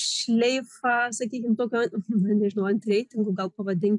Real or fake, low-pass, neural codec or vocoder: fake; 10.8 kHz; vocoder, 44.1 kHz, 128 mel bands every 256 samples, BigVGAN v2